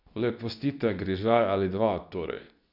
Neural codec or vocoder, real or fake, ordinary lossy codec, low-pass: codec, 24 kHz, 0.9 kbps, WavTokenizer, medium speech release version 2; fake; none; 5.4 kHz